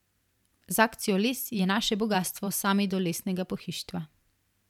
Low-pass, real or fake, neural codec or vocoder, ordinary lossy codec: 19.8 kHz; fake; vocoder, 44.1 kHz, 128 mel bands every 512 samples, BigVGAN v2; none